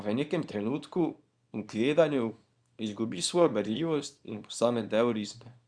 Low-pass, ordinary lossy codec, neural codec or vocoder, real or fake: 9.9 kHz; Opus, 64 kbps; codec, 24 kHz, 0.9 kbps, WavTokenizer, small release; fake